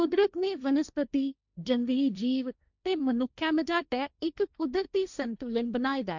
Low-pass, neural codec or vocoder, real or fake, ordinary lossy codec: 7.2 kHz; codec, 16 kHz, 1.1 kbps, Voila-Tokenizer; fake; none